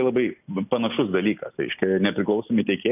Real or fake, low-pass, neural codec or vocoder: real; 3.6 kHz; none